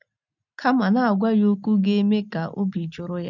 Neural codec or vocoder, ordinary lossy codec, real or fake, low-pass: none; none; real; 7.2 kHz